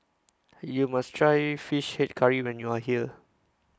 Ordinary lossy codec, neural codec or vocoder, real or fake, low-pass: none; none; real; none